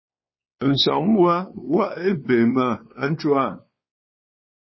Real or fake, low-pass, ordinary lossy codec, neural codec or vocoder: fake; 7.2 kHz; MP3, 24 kbps; codec, 16 kHz, 2 kbps, X-Codec, WavLM features, trained on Multilingual LibriSpeech